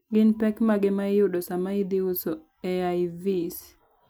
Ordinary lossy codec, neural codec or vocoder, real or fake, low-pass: none; none; real; none